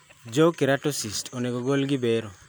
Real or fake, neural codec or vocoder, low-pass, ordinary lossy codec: real; none; none; none